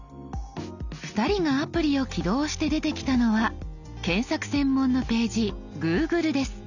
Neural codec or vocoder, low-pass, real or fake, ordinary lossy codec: none; 7.2 kHz; real; none